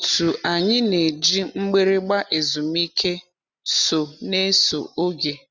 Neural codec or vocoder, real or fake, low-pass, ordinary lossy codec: none; real; 7.2 kHz; none